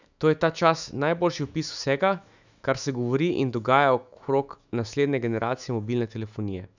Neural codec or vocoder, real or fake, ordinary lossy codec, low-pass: autoencoder, 48 kHz, 128 numbers a frame, DAC-VAE, trained on Japanese speech; fake; none; 7.2 kHz